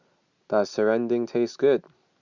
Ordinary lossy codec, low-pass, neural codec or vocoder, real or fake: Opus, 64 kbps; 7.2 kHz; none; real